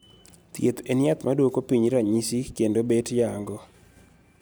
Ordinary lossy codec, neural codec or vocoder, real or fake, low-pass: none; none; real; none